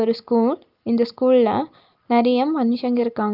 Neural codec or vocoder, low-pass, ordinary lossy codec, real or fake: none; 5.4 kHz; Opus, 32 kbps; real